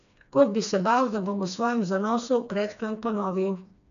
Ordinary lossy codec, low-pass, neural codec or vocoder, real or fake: none; 7.2 kHz; codec, 16 kHz, 2 kbps, FreqCodec, smaller model; fake